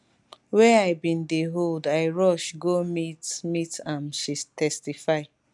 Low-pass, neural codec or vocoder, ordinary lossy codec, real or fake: 10.8 kHz; none; none; real